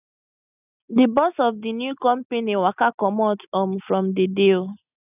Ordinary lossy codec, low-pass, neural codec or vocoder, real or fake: none; 3.6 kHz; none; real